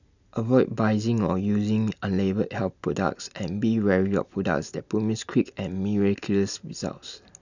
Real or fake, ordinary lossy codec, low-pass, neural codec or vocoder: real; none; 7.2 kHz; none